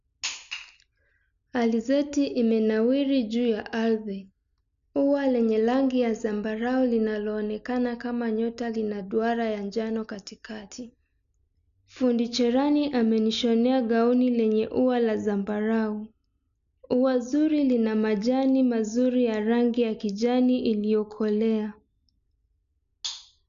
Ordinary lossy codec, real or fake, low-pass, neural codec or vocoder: MP3, 96 kbps; real; 7.2 kHz; none